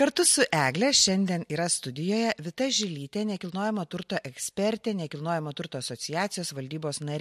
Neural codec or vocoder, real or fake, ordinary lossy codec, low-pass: none; real; MP3, 64 kbps; 14.4 kHz